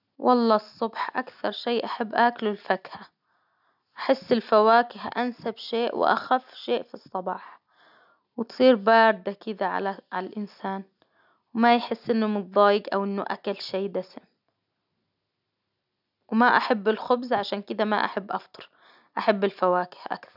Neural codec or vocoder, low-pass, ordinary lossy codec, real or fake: none; 5.4 kHz; none; real